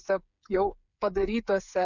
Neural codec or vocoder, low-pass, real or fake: codec, 16 kHz, 8 kbps, FreqCodec, smaller model; 7.2 kHz; fake